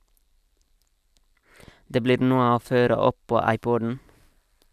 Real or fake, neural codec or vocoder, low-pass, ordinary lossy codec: real; none; 14.4 kHz; none